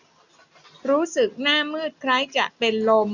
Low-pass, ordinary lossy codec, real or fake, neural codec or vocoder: 7.2 kHz; none; real; none